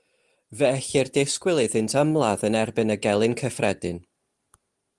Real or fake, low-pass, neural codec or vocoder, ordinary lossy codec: real; 10.8 kHz; none; Opus, 24 kbps